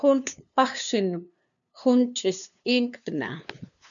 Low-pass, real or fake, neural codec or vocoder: 7.2 kHz; fake; codec, 16 kHz, 2 kbps, FunCodec, trained on LibriTTS, 25 frames a second